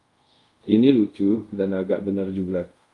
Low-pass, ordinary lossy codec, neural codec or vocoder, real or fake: 10.8 kHz; Opus, 32 kbps; codec, 24 kHz, 0.5 kbps, DualCodec; fake